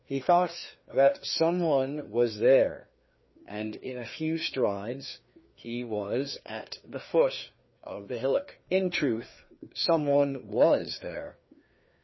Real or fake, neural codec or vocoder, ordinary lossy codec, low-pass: fake; codec, 16 kHz, 2 kbps, FreqCodec, larger model; MP3, 24 kbps; 7.2 kHz